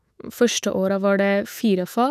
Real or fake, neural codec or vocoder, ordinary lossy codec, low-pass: fake; autoencoder, 48 kHz, 128 numbers a frame, DAC-VAE, trained on Japanese speech; none; 14.4 kHz